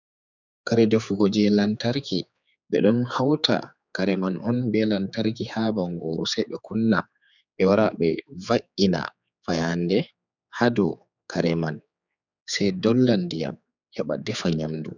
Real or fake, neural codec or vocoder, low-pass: fake; codec, 16 kHz, 4 kbps, X-Codec, HuBERT features, trained on general audio; 7.2 kHz